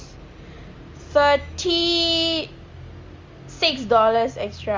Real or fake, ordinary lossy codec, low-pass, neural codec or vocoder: real; Opus, 32 kbps; 7.2 kHz; none